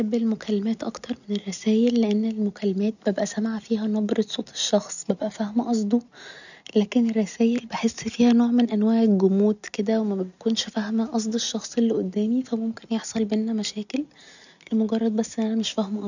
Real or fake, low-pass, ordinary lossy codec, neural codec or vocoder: real; 7.2 kHz; none; none